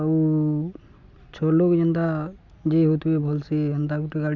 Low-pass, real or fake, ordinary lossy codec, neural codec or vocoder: 7.2 kHz; real; none; none